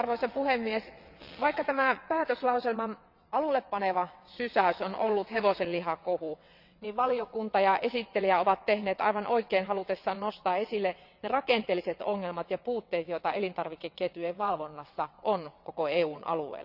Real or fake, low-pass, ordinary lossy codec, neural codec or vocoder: fake; 5.4 kHz; none; vocoder, 22.05 kHz, 80 mel bands, WaveNeXt